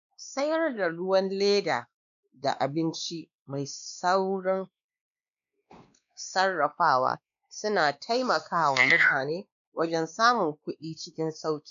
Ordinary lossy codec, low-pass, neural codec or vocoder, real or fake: none; 7.2 kHz; codec, 16 kHz, 2 kbps, X-Codec, WavLM features, trained on Multilingual LibriSpeech; fake